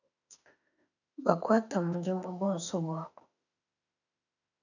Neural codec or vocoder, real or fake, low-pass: autoencoder, 48 kHz, 32 numbers a frame, DAC-VAE, trained on Japanese speech; fake; 7.2 kHz